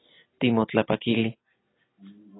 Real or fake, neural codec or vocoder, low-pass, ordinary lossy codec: real; none; 7.2 kHz; AAC, 16 kbps